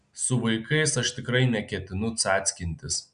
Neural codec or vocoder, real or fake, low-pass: none; real; 9.9 kHz